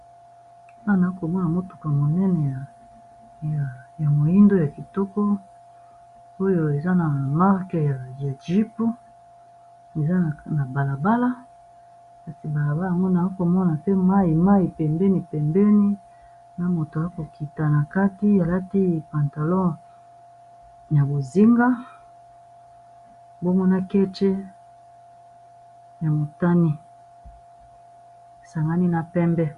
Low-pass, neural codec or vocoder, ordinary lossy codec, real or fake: 10.8 kHz; none; Opus, 64 kbps; real